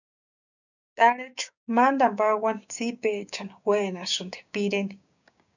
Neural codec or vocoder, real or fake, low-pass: codec, 16 kHz, 6 kbps, DAC; fake; 7.2 kHz